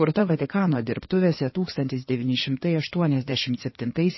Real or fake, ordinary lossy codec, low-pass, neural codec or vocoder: fake; MP3, 24 kbps; 7.2 kHz; codec, 16 kHz in and 24 kHz out, 2.2 kbps, FireRedTTS-2 codec